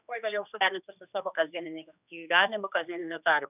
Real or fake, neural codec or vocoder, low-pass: fake; codec, 16 kHz, 2 kbps, X-Codec, HuBERT features, trained on general audio; 3.6 kHz